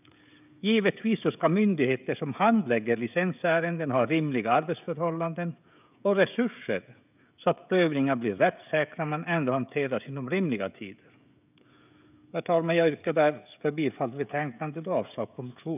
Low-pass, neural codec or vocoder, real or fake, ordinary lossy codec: 3.6 kHz; codec, 16 kHz, 16 kbps, FreqCodec, smaller model; fake; none